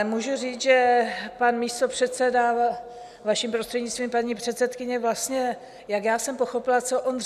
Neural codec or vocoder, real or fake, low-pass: none; real; 14.4 kHz